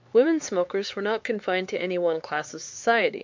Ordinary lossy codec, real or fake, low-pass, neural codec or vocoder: MP3, 48 kbps; fake; 7.2 kHz; codec, 16 kHz, 4 kbps, X-Codec, HuBERT features, trained on LibriSpeech